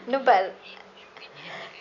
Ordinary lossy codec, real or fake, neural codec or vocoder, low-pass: none; real; none; 7.2 kHz